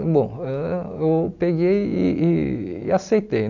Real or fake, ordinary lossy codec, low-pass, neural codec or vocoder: real; none; 7.2 kHz; none